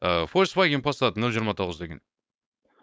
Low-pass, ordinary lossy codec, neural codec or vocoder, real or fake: none; none; codec, 16 kHz, 4.8 kbps, FACodec; fake